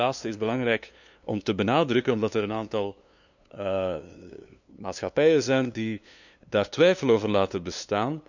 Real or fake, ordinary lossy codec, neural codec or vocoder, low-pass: fake; none; codec, 16 kHz, 2 kbps, FunCodec, trained on LibriTTS, 25 frames a second; 7.2 kHz